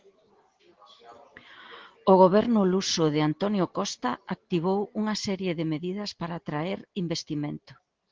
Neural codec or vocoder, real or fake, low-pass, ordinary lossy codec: none; real; 7.2 kHz; Opus, 16 kbps